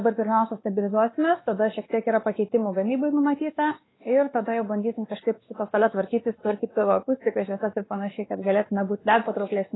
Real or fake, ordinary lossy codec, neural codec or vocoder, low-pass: fake; AAC, 16 kbps; codec, 16 kHz, 2 kbps, X-Codec, WavLM features, trained on Multilingual LibriSpeech; 7.2 kHz